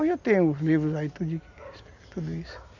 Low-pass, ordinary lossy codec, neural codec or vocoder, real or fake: 7.2 kHz; none; none; real